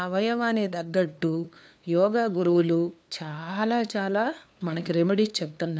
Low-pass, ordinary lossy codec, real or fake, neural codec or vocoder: none; none; fake; codec, 16 kHz, 2 kbps, FunCodec, trained on LibriTTS, 25 frames a second